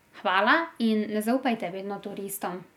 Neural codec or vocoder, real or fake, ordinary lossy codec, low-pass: none; real; none; 19.8 kHz